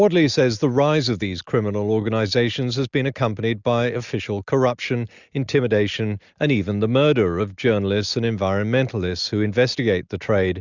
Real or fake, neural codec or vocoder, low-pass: real; none; 7.2 kHz